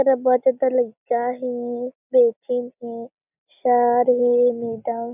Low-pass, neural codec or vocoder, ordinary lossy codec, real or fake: 3.6 kHz; none; none; real